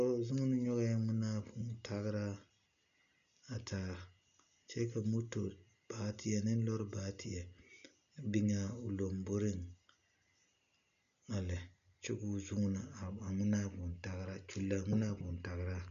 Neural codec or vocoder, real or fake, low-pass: none; real; 7.2 kHz